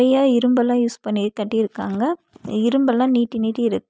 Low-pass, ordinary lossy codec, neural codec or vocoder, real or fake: none; none; none; real